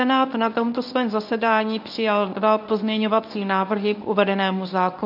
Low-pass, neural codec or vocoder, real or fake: 5.4 kHz; codec, 24 kHz, 0.9 kbps, WavTokenizer, medium speech release version 1; fake